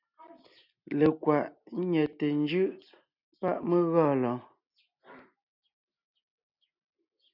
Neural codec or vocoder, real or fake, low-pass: none; real; 5.4 kHz